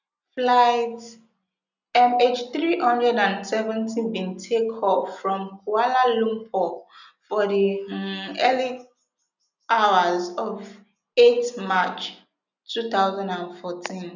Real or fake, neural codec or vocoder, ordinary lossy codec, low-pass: real; none; none; 7.2 kHz